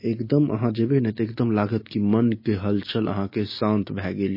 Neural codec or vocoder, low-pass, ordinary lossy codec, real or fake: none; 5.4 kHz; MP3, 24 kbps; real